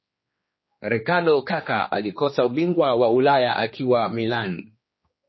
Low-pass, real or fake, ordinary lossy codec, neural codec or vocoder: 7.2 kHz; fake; MP3, 24 kbps; codec, 16 kHz, 2 kbps, X-Codec, HuBERT features, trained on general audio